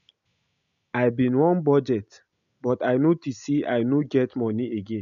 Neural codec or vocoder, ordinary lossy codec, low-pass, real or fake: none; MP3, 96 kbps; 7.2 kHz; real